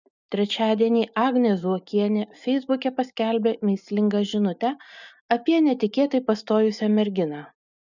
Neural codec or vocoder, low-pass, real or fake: none; 7.2 kHz; real